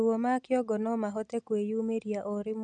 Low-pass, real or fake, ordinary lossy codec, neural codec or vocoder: 9.9 kHz; real; none; none